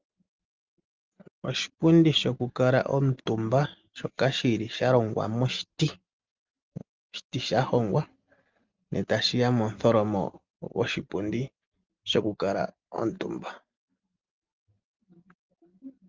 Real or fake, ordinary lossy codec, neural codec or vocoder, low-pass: real; Opus, 24 kbps; none; 7.2 kHz